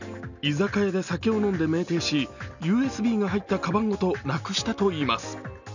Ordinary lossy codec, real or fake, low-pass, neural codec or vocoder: none; real; 7.2 kHz; none